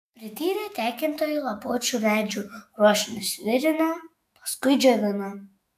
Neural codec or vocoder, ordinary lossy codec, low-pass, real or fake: autoencoder, 48 kHz, 128 numbers a frame, DAC-VAE, trained on Japanese speech; AAC, 96 kbps; 14.4 kHz; fake